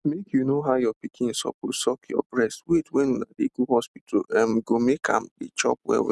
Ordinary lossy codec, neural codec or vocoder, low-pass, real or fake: none; none; none; real